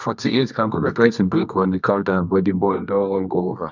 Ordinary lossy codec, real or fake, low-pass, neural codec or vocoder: none; fake; 7.2 kHz; codec, 24 kHz, 0.9 kbps, WavTokenizer, medium music audio release